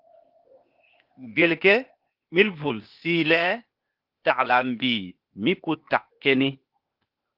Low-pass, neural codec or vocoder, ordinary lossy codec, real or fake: 5.4 kHz; codec, 16 kHz, 0.8 kbps, ZipCodec; Opus, 32 kbps; fake